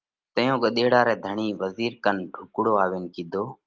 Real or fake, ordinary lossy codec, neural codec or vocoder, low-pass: real; Opus, 32 kbps; none; 7.2 kHz